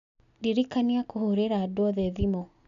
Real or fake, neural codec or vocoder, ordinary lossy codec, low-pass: real; none; none; 7.2 kHz